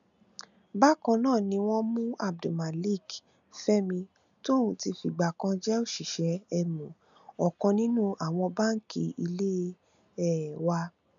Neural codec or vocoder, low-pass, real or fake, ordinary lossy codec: none; 7.2 kHz; real; none